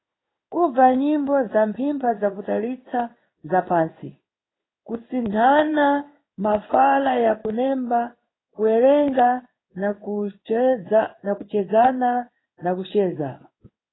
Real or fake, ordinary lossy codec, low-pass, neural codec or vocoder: fake; AAC, 16 kbps; 7.2 kHz; codec, 16 kHz in and 24 kHz out, 1 kbps, XY-Tokenizer